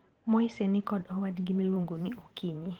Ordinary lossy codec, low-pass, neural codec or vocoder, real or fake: Opus, 16 kbps; 19.8 kHz; vocoder, 44.1 kHz, 128 mel bands every 512 samples, BigVGAN v2; fake